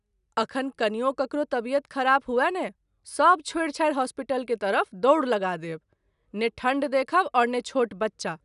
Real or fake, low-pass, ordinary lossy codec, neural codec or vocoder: real; 10.8 kHz; none; none